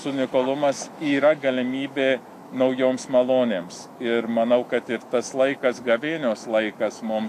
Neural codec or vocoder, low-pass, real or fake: autoencoder, 48 kHz, 128 numbers a frame, DAC-VAE, trained on Japanese speech; 14.4 kHz; fake